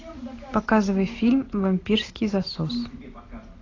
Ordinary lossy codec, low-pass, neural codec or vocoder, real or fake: AAC, 32 kbps; 7.2 kHz; none; real